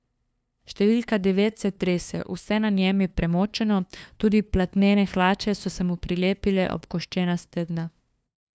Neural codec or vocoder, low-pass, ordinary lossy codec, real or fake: codec, 16 kHz, 2 kbps, FunCodec, trained on LibriTTS, 25 frames a second; none; none; fake